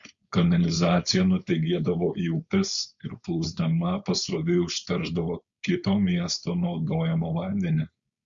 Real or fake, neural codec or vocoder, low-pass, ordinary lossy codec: fake; codec, 16 kHz, 4.8 kbps, FACodec; 7.2 kHz; Opus, 64 kbps